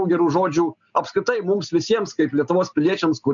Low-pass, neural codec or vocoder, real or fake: 7.2 kHz; none; real